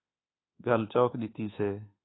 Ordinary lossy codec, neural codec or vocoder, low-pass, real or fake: AAC, 16 kbps; codec, 24 kHz, 1.2 kbps, DualCodec; 7.2 kHz; fake